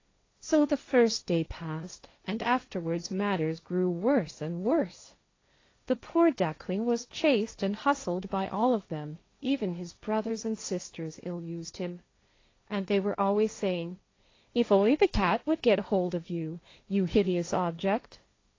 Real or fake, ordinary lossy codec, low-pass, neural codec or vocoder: fake; AAC, 32 kbps; 7.2 kHz; codec, 16 kHz, 1.1 kbps, Voila-Tokenizer